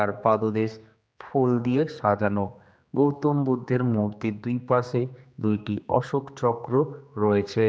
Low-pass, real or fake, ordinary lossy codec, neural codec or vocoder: none; fake; none; codec, 16 kHz, 2 kbps, X-Codec, HuBERT features, trained on general audio